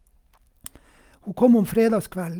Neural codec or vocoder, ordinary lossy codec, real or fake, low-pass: none; Opus, 32 kbps; real; 19.8 kHz